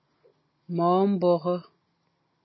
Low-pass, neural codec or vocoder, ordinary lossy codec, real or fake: 7.2 kHz; none; MP3, 24 kbps; real